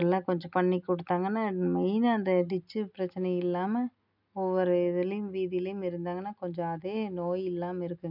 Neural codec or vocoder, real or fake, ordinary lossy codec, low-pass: none; real; none; 5.4 kHz